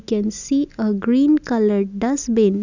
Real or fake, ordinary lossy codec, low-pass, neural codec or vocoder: real; none; 7.2 kHz; none